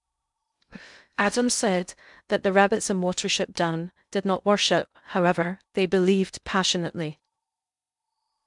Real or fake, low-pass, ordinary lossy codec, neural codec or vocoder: fake; 10.8 kHz; none; codec, 16 kHz in and 24 kHz out, 0.6 kbps, FocalCodec, streaming, 2048 codes